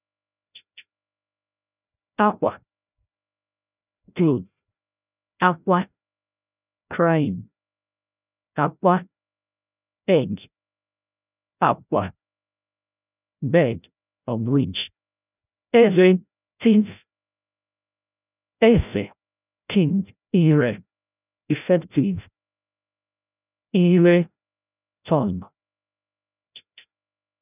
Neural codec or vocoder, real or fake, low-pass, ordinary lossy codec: codec, 16 kHz, 0.5 kbps, FreqCodec, larger model; fake; 3.6 kHz; none